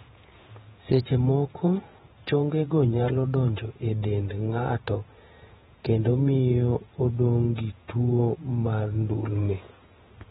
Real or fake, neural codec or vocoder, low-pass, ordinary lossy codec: fake; vocoder, 48 kHz, 128 mel bands, Vocos; 19.8 kHz; AAC, 16 kbps